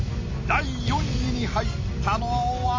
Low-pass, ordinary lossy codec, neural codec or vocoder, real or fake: 7.2 kHz; MP3, 32 kbps; none; real